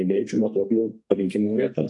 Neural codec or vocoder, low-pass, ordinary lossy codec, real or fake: codec, 32 kHz, 1.9 kbps, SNAC; 10.8 kHz; AAC, 48 kbps; fake